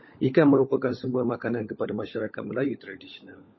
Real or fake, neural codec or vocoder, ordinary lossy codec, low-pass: fake; codec, 16 kHz, 16 kbps, FunCodec, trained on LibriTTS, 50 frames a second; MP3, 24 kbps; 7.2 kHz